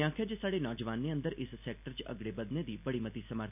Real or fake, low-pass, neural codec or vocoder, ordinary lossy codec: real; 3.6 kHz; none; none